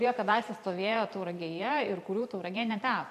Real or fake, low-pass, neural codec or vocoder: fake; 14.4 kHz; vocoder, 44.1 kHz, 128 mel bands every 512 samples, BigVGAN v2